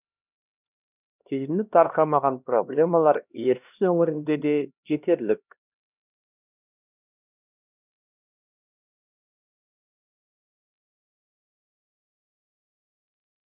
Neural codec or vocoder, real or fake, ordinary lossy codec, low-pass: codec, 16 kHz, 1 kbps, X-Codec, HuBERT features, trained on LibriSpeech; fake; none; 3.6 kHz